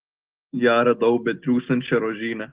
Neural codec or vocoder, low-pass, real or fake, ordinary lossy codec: none; 3.6 kHz; real; Opus, 16 kbps